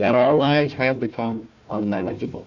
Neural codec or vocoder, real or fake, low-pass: codec, 16 kHz, 1 kbps, FunCodec, trained on Chinese and English, 50 frames a second; fake; 7.2 kHz